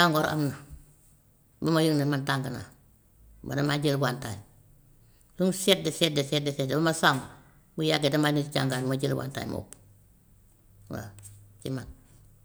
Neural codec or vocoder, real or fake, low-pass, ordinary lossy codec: none; real; none; none